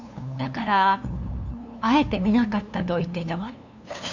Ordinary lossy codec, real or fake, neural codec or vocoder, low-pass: none; fake; codec, 16 kHz, 2 kbps, FunCodec, trained on LibriTTS, 25 frames a second; 7.2 kHz